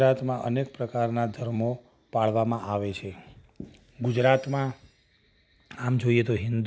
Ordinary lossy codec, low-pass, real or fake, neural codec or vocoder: none; none; real; none